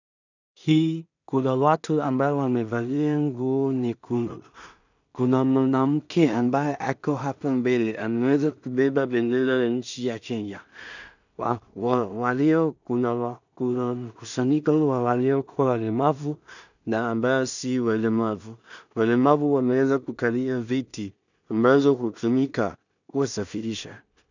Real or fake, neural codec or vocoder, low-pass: fake; codec, 16 kHz in and 24 kHz out, 0.4 kbps, LongCat-Audio-Codec, two codebook decoder; 7.2 kHz